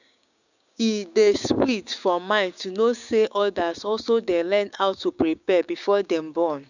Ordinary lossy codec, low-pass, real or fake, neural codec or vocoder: none; 7.2 kHz; fake; codec, 16 kHz, 6 kbps, DAC